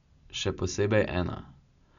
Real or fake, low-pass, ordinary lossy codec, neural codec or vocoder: real; 7.2 kHz; none; none